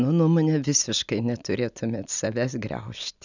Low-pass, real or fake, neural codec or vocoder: 7.2 kHz; real; none